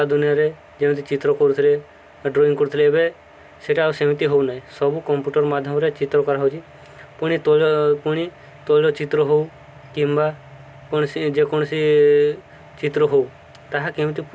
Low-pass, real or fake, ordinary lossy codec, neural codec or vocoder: none; real; none; none